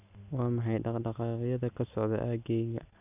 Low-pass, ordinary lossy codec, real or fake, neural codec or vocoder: 3.6 kHz; none; real; none